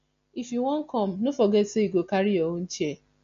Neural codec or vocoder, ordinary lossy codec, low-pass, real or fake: none; MP3, 48 kbps; 7.2 kHz; real